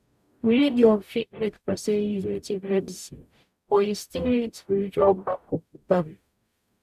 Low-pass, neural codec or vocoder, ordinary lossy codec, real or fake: 14.4 kHz; codec, 44.1 kHz, 0.9 kbps, DAC; none; fake